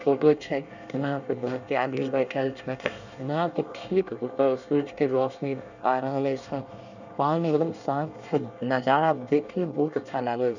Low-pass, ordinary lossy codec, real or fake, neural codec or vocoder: 7.2 kHz; none; fake; codec, 24 kHz, 1 kbps, SNAC